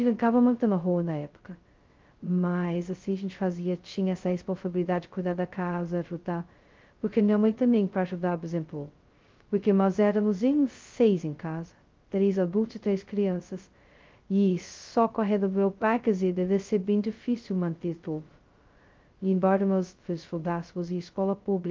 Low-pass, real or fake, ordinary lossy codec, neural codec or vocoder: 7.2 kHz; fake; Opus, 32 kbps; codec, 16 kHz, 0.2 kbps, FocalCodec